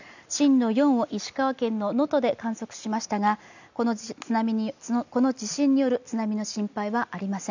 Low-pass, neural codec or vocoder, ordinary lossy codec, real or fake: 7.2 kHz; none; none; real